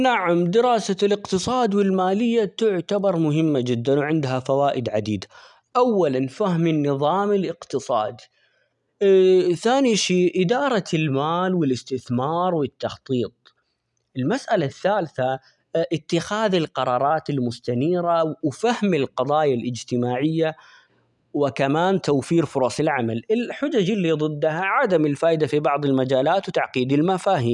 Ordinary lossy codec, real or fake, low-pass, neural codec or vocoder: none; real; 10.8 kHz; none